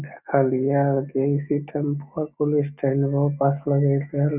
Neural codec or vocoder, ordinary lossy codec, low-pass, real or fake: none; none; 3.6 kHz; real